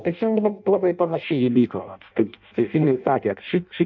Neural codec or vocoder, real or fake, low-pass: codec, 16 kHz in and 24 kHz out, 0.6 kbps, FireRedTTS-2 codec; fake; 7.2 kHz